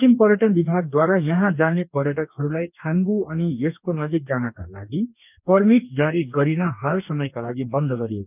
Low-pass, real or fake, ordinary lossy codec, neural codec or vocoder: 3.6 kHz; fake; none; codec, 44.1 kHz, 2.6 kbps, DAC